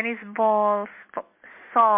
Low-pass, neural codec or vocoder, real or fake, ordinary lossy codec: 3.6 kHz; none; real; MP3, 24 kbps